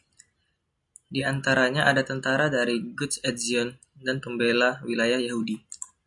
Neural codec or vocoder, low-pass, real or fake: none; 10.8 kHz; real